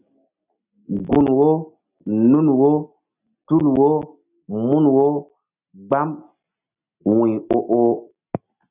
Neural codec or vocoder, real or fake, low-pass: none; real; 3.6 kHz